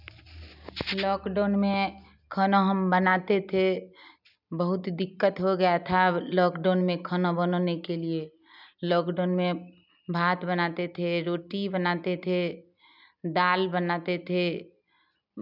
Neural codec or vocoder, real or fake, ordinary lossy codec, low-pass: none; real; none; 5.4 kHz